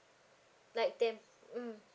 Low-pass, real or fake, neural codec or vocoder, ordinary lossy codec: none; real; none; none